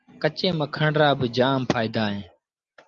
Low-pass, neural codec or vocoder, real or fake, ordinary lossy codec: 7.2 kHz; none; real; Opus, 32 kbps